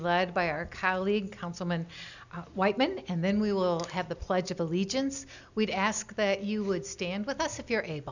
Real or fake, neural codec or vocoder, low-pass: real; none; 7.2 kHz